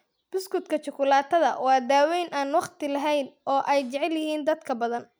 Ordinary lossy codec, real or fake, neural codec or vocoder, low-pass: none; real; none; none